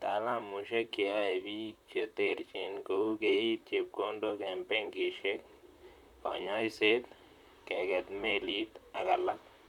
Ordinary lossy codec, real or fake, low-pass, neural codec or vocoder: none; fake; 19.8 kHz; vocoder, 44.1 kHz, 128 mel bands, Pupu-Vocoder